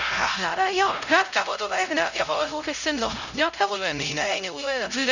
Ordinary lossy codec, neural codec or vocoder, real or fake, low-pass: none; codec, 16 kHz, 0.5 kbps, X-Codec, HuBERT features, trained on LibriSpeech; fake; 7.2 kHz